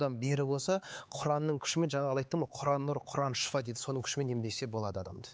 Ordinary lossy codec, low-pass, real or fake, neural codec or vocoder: none; none; fake; codec, 16 kHz, 4 kbps, X-Codec, HuBERT features, trained on LibriSpeech